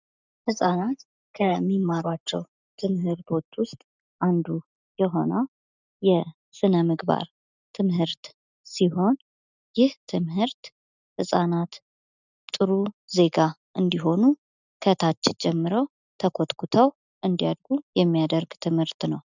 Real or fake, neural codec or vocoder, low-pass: real; none; 7.2 kHz